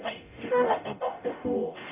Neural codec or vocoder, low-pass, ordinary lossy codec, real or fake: codec, 44.1 kHz, 0.9 kbps, DAC; 3.6 kHz; none; fake